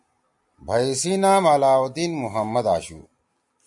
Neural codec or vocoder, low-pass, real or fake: none; 10.8 kHz; real